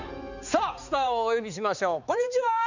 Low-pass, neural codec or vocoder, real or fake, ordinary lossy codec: 7.2 kHz; codec, 16 kHz, 4 kbps, X-Codec, HuBERT features, trained on balanced general audio; fake; none